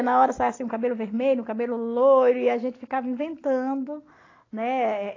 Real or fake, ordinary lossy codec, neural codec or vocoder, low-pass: real; AAC, 32 kbps; none; 7.2 kHz